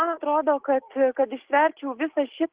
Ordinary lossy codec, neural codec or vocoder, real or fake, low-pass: Opus, 16 kbps; none; real; 3.6 kHz